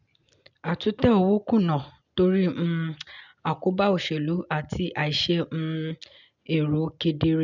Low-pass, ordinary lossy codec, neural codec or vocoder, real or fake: 7.2 kHz; none; none; real